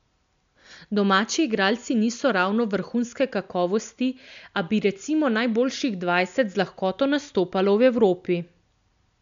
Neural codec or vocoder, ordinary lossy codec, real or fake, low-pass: none; MP3, 64 kbps; real; 7.2 kHz